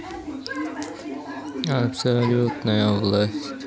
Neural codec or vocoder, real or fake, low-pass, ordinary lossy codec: none; real; none; none